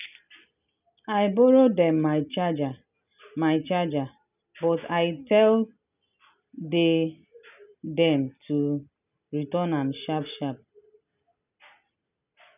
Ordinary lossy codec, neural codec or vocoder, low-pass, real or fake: none; none; 3.6 kHz; real